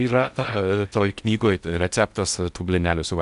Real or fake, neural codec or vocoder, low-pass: fake; codec, 16 kHz in and 24 kHz out, 0.8 kbps, FocalCodec, streaming, 65536 codes; 10.8 kHz